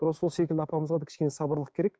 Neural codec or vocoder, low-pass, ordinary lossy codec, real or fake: codec, 16 kHz, 6 kbps, DAC; none; none; fake